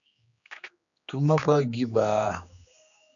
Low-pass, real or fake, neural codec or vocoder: 7.2 kHz; fake; codec, 16 kHz, 2 kbps, X-Codec, HuBERT features, trained on general audio